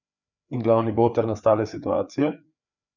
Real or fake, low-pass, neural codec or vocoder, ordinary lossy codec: fake; 7.2 kHz; codec, 16 kHz, 4 kbps, FreqCodec, larger model; none